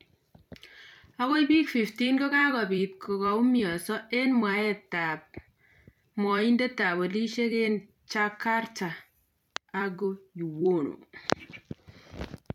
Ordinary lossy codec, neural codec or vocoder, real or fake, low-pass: MP3, 96 kbps; vocoder, 44.1 kHz, 128 mel bands every 256 samples, BigVGAN v2; fake; 19.8 kHz